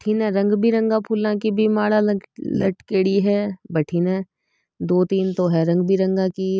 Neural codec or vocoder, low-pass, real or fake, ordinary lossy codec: none; none; real; none